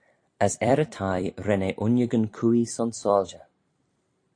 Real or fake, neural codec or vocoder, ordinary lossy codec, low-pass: fake; vocoder, 44.1 kHz, 128 mel bands every 256 samples, BigVGAN v2; AAC, 48 kbps; 9.9 kHz